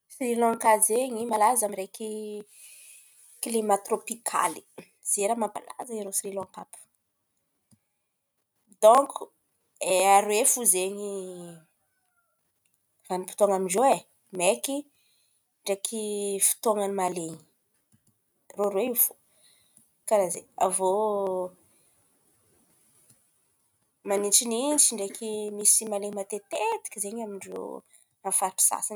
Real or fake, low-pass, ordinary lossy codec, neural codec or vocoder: real; none; none; none